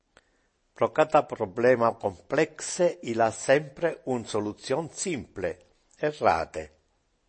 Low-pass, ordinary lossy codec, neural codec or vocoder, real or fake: 9.9 kHz; MP3, 32 kbps; none; real